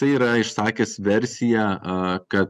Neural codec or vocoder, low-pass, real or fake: none; 14.4 kHz; real